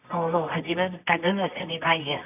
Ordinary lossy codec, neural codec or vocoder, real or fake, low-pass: none; codec, 24 kHz, 0.9 kbps, WavTokenizer, medium music audio release; fake; 3.6 kHz